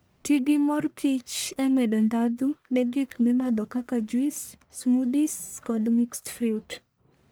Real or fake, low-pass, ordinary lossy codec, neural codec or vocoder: fake; none; none; codec, 44.1 kHz, 1.7 kbps, Pupu-Codec